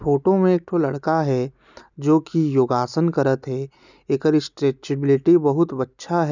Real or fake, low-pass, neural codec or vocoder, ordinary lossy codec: real; 7.2 kHz; none; none